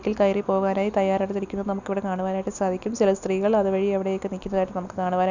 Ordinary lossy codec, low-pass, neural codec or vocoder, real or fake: none; 7.2 kHz; none; real